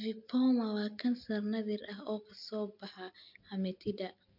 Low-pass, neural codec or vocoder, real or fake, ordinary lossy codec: 5.4 kHz; none; real; none